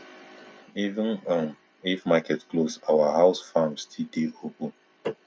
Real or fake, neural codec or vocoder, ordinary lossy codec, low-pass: real; none; none; none